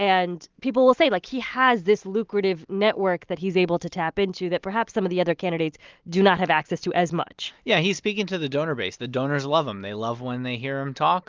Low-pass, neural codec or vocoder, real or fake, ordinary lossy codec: 7.2 kHz; none; real; Opus, 32 kbps